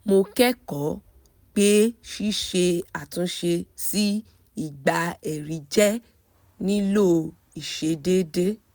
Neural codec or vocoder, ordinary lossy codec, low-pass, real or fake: none; none; none; real